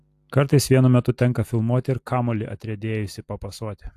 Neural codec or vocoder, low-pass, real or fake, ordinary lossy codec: none; 14.4 kHz; real; AAC, 64 kbps